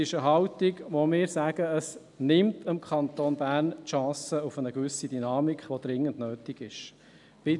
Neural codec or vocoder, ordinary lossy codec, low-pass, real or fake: none; none; 10.8 kHz; real